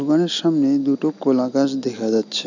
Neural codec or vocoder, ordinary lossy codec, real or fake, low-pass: none; none; real; 7.2 kHz